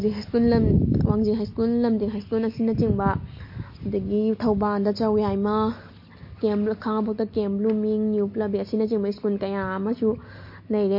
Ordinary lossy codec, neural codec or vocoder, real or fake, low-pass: MP3, 32 kbps; none; real; 5.4 kHz